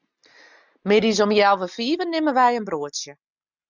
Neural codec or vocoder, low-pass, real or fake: none; 7.2 kHz; real